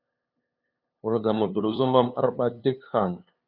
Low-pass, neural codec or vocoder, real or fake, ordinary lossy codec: 5.4 kHz; codec, 16 kHz, 2 kbps, FunCodec, trained on LibriTTS, 25 frames a second; fake; Opus, 64 kbps